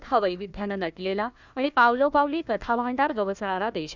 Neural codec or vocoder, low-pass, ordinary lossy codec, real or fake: codec, 16 kHz, 1 kbps, FunCodec, trained on Chinese and English, 50 frames a second; 7.2 kHz; none; fake